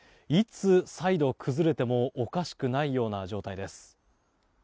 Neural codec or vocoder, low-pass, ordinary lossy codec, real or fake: none; none; none; real